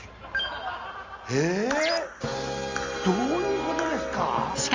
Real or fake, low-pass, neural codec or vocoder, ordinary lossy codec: real; 7.2 kHz; none; Opus, 32 kbps